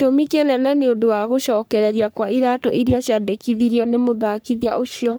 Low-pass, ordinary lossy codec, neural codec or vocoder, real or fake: none; none; codec, 44.1 kHz, 3.4 kbps, Pupu-Codec; fake